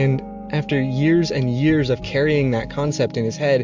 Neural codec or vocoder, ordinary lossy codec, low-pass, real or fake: none; MP3, 64 kbps; 7.2 kHz; real